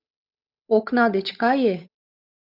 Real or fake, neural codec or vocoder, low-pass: fake; codec, 16 kHz, 8 kbps, FunCodec, trained on Chinese and English, 25 frames a second; 5.4 kHz